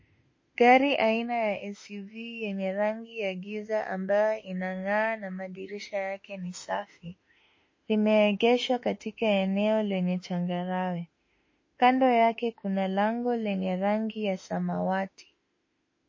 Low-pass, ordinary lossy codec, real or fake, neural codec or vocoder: 7.2 kHz; MP3, 32 kbps; fake; autoencoder, 48 kHz, 32 numbers a frame, DAC-VAE, trained on Japanese speech